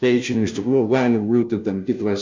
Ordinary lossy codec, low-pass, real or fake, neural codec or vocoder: MP3, 64 kbps; 7.2 kHz; fake; codec, 16 kHz, 0.5 kbps, FunCodec, trained on Chinese and English, 25 frames a second